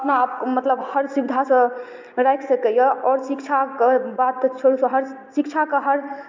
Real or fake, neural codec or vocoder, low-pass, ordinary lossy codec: real; none; 7.2 kHz; MP3, 64 kbps